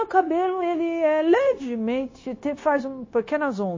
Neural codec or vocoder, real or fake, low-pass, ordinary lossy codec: codec, 16 kHz, 0.9 kbps, LongCat-Audio-Codec; fake; 7.2 kHz; MP3, 32 kbps